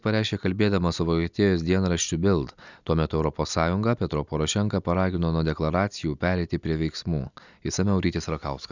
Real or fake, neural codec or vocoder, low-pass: real; none; 7.2 kHz